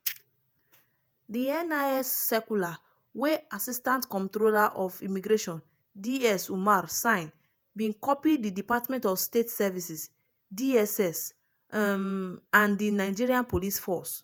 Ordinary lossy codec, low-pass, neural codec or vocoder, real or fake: none; none; vocoder, 48 kHz, 128 mel bands, Vocos; fake